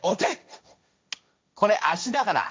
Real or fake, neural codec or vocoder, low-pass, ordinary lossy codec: fake; codec, 16 kHz, 1.1 kbps, Voila-Tokenizer; none; none